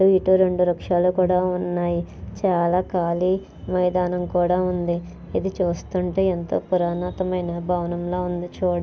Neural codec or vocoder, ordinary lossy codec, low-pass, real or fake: none; none; none; real